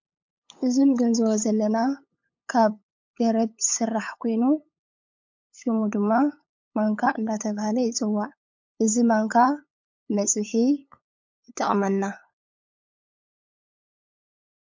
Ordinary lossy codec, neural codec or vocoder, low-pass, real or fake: MP3, 48 kbps; codec, 16 kHz, 8 kbps, FunCodec, trained on LibriTTS, 25 frames a second; 7.2 kHz; fake